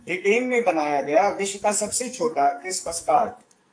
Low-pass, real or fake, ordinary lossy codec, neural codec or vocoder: 9.9 kHz; fake; AAC, 64 kbps; codec, 44.1 kHz, 2.6 kbps, SNAC